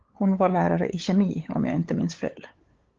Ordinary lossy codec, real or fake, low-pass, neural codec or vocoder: Opus, 16 kbps; fake; 7.2 kHz; codec, 16 kHz, 8 kbps, FunCodec, trained on LibriTTS, 25 frames a second